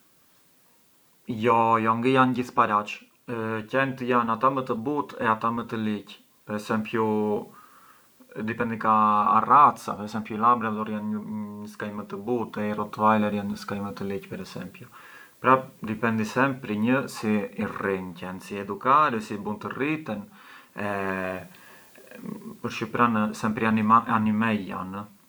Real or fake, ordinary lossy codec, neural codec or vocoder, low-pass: real; none; none; none